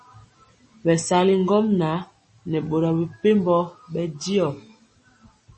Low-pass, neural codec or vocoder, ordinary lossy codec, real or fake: 10.8 kHz; none; MP3, 32 kbps; real